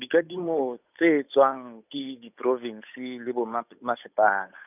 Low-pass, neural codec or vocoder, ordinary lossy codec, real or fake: 3.6 kHz; codec, 16 kHz, 8 kbps, FunCodec, trained on Chinese and English, 25 frames a second; none; fake